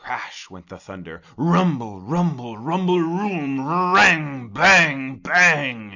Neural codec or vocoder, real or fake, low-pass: none; real; 7.2 kHz